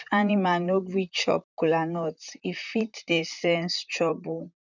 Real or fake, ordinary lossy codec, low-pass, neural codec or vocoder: fake; none; 7.2 kHz; vocoder, 44.1 kHz, 128 mel bands, Pupu-Vocoder